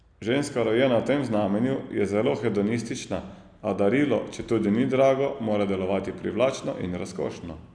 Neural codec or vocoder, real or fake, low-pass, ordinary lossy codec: none; real; 9.9 kHz; none